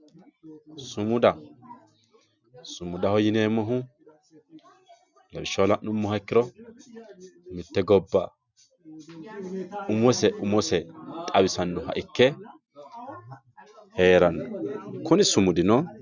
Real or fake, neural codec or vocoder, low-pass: real; none; 7.2 kHz